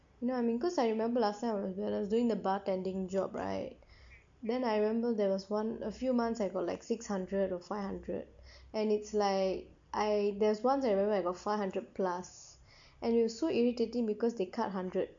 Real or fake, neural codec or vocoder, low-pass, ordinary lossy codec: real; none; 7.2 kHz; AAC, 64 kbps